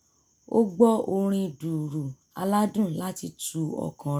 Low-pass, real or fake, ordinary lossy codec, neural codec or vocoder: none; real; none; none